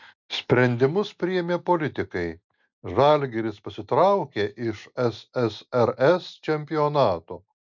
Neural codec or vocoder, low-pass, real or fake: none; 7.2 kHz; real